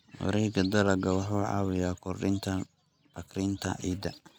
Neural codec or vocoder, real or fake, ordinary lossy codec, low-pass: none; real; none; none